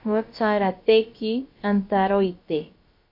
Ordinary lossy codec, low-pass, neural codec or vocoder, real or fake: MP3, 32 kbps; 5.4 kHz; codec, 16 kHz, about 1 kbps, DyCAST, with the encoder's durations; fake